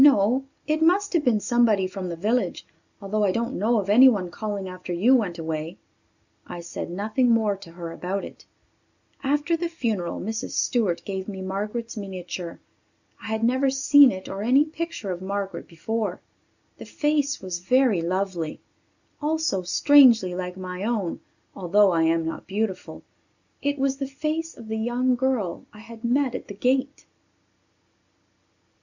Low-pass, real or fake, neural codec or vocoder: 7.2 kHz; real; none